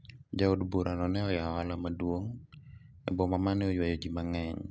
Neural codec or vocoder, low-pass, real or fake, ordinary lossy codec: none; none; real; none